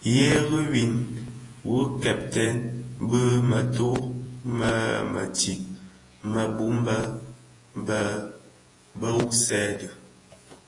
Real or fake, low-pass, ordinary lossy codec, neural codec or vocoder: fake; 10.8 kHz; AAC, 32 kbps; vocoder, 48 kHz, 128 mel bands, Vocos